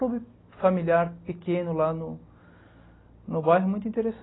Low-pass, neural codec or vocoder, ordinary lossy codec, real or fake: 7.2 kHz; none; AAC, 16 kbps; real